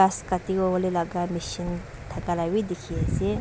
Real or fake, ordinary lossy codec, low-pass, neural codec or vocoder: real; none; none; none